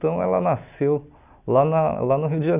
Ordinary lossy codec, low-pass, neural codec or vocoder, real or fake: none; 3.6 kHz; none; real